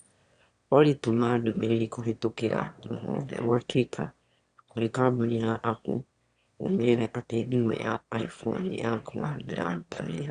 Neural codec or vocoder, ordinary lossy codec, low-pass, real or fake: autoencoder, 22.05 kHz, a latent of 192 numbers a frame, VITS, trained on one speaker; Opus, 64 kbps; 9.9 kHz; fake